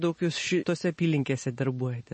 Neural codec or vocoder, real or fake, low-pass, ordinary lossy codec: none; real; 10.8 kHz; MP3, 32 kbps